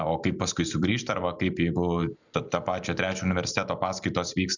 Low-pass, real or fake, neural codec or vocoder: 7.2 kHz; fake; vocoder, 44.1 kHz, 128 mel bands every 256 samples, BigVGAN v2